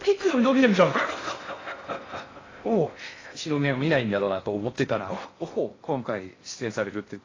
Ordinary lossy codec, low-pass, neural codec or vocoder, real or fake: AAC, 32 kbps; 7.2 kHz; codec, 16 kHz in and 24 kHz out, 0.6 kbps, FocalCodec, streaming, 2048 codes; fake